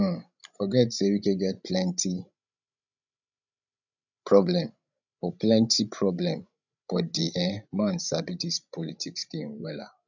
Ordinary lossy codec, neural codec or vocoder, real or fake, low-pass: none; codec, 16 kHz, 16 kbps, FreqCodec, larger model; fake; 7.2 kHz